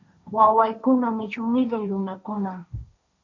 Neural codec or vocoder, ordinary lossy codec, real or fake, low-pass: codec, 16 kHz, 1.1 kbps, Voila-Tokenizer; MP3, 64 kbps; fake; 7.2 kHz